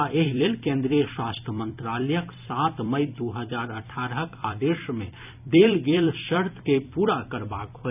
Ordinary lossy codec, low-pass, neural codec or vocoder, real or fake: none; 3.6 kHz; vocoder, 44.1 kHz, 128 mel bands every 256 samples, BigVGAN v2; fake